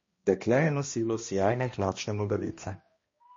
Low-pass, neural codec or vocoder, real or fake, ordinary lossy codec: 7.2 kHz; codec, 16 kHz, 1 kbps, X-Codec, HuBERT features, trained on balanced general audio; fake; MP3, 32 kbps